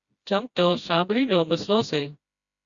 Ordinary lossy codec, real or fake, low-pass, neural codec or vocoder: Opus, 64 kbps; fake; 7.2 kHz; codec, 16 kHz, 1 kbps, FreqCodec, smaller model